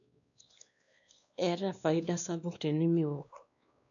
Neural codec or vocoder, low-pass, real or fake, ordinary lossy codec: codec, 16 kHz, 2 kbps, X-Codec, WavLM features, trained on Multilingual LibriSpeech; 7.2 kHz; fake; none